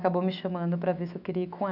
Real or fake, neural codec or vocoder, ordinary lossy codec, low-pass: fake; autoencoder, 48 kHz, 128 numbers a frame, DAC-VAE, trained on Japanese speech; none; 5.4 kHz